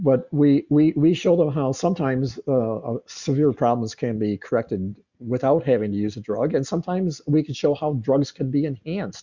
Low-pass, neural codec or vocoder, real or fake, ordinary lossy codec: 7.2 kHz; none; real; Opus, 64 kbps